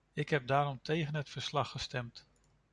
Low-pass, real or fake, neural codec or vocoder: 10.8 kHz; real; none